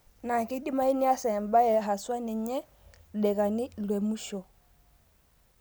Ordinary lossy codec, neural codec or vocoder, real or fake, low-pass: none; none; real; none